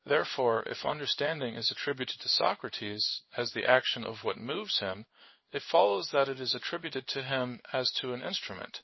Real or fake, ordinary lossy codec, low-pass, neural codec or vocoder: fake; MP3, 24 kbps; 7.2 kHz; codec, 16 kHz in and 24 kHz out, 1 kbps, XY-Tokenizer